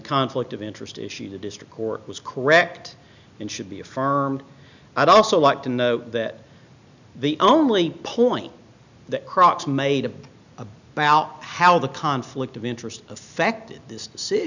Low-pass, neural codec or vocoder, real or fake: 7.2 kHz; none; real